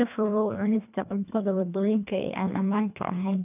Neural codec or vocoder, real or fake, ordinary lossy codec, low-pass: codec, 24 kHz, 1.5 kbps, HILCodec; fake; none; 3.6 kHz